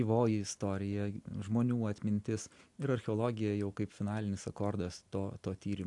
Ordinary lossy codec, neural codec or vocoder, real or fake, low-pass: AAC, 64 kbps; none; real; 10.8 kHz